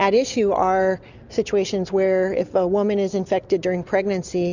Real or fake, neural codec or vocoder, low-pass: real; none; 7.2 kHz